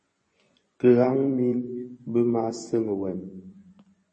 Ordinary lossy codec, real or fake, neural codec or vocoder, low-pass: MP3, 32 kbps; fake; vocoder, 24 kHz, 100 mel bands, Vocos; 10.8 kHz